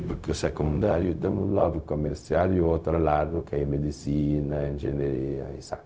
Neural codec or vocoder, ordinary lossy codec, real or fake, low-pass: codec, 16 kHz, 0.4 kbps, LongCat-Audio-Codec; none; fake; none